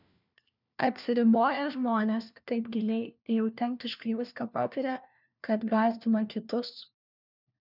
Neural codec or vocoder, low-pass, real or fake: codec, 16 kHz, 1 kbps, FunCodec, trained on LibriTTS, 50 frames a second; 5.4 kHz; fake